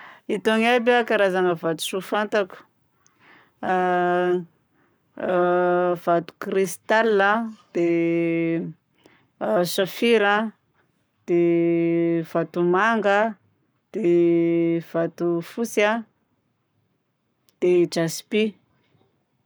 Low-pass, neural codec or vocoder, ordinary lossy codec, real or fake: none; codec, 44.1 kHz, 7.8 kbps, Pupu-Codec; none; fake